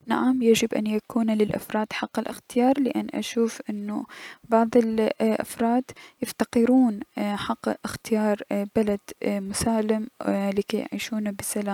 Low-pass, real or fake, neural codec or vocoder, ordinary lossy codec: 19.8 kHz; real; none; none